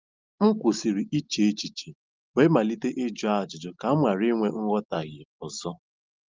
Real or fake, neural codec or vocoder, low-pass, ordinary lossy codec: real; none; 7.2 kHz; Opus, 32 kbps